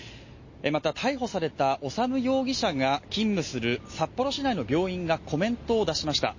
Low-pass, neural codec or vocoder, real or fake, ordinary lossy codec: 7.2 kHz; none; real; MP3, 32 kbps